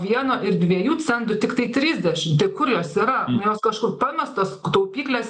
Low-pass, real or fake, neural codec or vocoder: 10.8 kHz; real; none